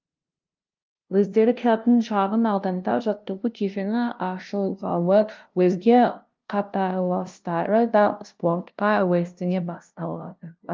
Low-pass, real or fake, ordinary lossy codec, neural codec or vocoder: 7.2 kHz; fake; Opus, 32 kbps; codec, 16 kHz, 0.5 kbps, FunCodec, trained on LibriTTS, 25 frames a second